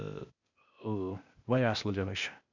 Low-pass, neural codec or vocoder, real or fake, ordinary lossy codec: 7.2 kHz; codec, 16 kHz, 0.8 kbps, ZipCodec; fake; none